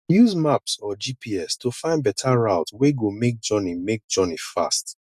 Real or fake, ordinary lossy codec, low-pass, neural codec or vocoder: real; none; 14.4 kHz; none